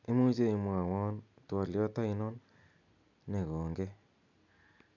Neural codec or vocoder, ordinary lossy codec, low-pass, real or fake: none; none; 7.2 kHz; real